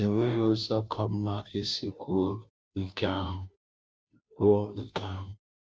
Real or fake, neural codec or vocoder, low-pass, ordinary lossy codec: fake; codec, 16 kHz, 0.5 kbps, FunCodec, trained on Chinese and English, 25 frames a second; none; none